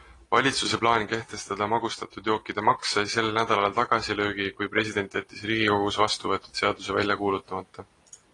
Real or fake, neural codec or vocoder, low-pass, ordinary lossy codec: real; none; 10.8 kHz; AAC, 32 kbps